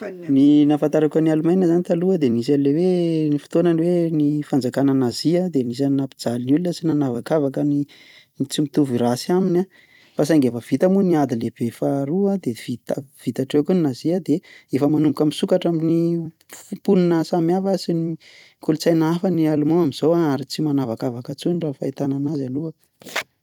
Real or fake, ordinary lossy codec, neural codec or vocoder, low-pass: fake; none; vocoder, 44.1 kHz, 128 mel bands every 256 samples, BigVGAN v2; 19.8 kHz